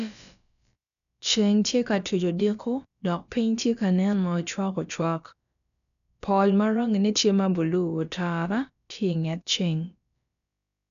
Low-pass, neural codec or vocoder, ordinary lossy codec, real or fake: 7.2 kHz; codec, 16 kHz, about 1 kbps, DyCAST, with the encoder's durations; none; fake